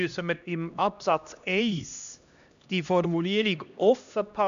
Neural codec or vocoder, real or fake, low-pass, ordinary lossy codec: codec, 16 kHz, 1 kbps, X-Codec, HuBERT features, trained on LibriSpeech; fake; 7.2 kHz; none